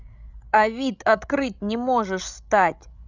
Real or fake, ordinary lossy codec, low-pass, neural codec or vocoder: fake; none; 7.2 kHz; codec, 16 kHz, 16 kbps, FreqCodec, larger model